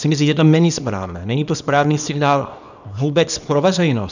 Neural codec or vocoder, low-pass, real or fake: codec, 24 kHz, 0.9 kbps, WavTokenizer, small release; 7.2 kHz; fake